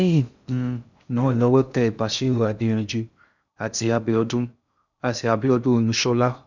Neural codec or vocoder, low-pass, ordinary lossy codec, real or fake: codec, 16 kHz in and 24 kHz out, 0.6 kbps, FocalCodec, streaming, 2048 codes; 7.2 kHz; none; fake